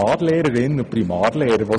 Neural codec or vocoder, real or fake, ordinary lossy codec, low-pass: none; real; none; 9.9 kHz